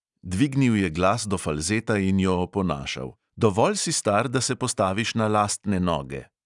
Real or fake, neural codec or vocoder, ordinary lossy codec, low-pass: real; none; none; 10.8 kHz